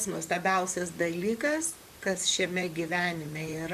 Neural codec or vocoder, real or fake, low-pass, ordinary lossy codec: vocoder, 44.1 kHz, 128 mel bands, Pupu-Vocoder; fake; 14.4 kHz; MP3, 96 kbps